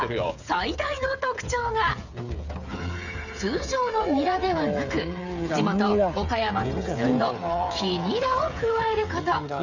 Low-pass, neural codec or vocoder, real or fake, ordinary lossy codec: 7.2 kHz; codec, 16 kHz, 8 kbps, FreqCodec, smaller model; fake; none